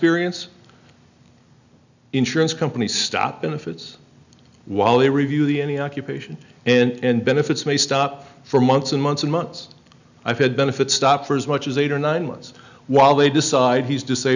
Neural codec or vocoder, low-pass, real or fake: none; 7.2 kHz; real